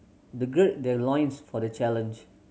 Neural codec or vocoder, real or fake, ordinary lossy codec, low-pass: none; real; none; none